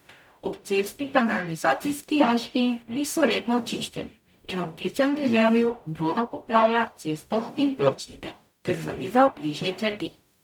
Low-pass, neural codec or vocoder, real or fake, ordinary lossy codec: 19.8 kHz; codec, 44.1 kHz, 0.9 kbps, DAC; fake; none